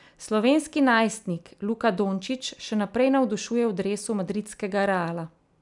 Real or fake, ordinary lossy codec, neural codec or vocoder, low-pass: real; none; none; 10.8 kHz